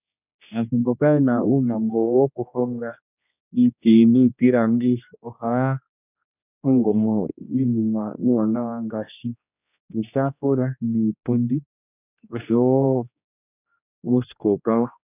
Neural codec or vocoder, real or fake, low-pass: codec, 16 kHz, 1 kbps, X-Codec, HuBERT features, trained on general audio; fake; 3.6 kHz